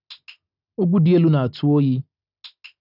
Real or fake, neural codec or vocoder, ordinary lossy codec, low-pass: real; none; none; 5.4 kHz